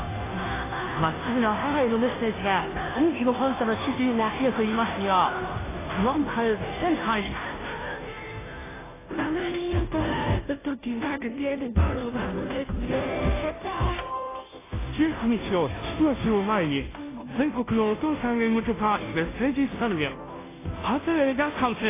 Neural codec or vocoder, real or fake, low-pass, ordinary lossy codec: codec, 16 kHz, 0.5 kbps, FunCodec, trained on Chinese and English, 25 frames a second; fake; 3.6 kHz; AAC, 16 kbps